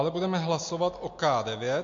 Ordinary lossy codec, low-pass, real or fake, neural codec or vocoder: MP3, 48 kbps; 7.2 kHz; real; none